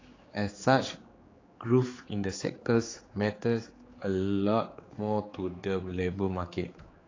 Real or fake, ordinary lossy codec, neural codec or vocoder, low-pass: fake; AAC, 32 kbps; codec, 16 kHz, 4 kbps, X-Codec, HuBERT features, trained on general audio; 7.2 kHz